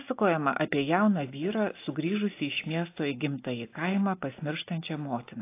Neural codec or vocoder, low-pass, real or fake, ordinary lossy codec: none; 3.6 kHz; real; AAC, 24 kbps